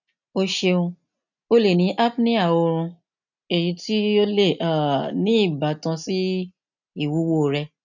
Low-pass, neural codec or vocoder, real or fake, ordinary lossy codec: 7.2 kHz; none; real; none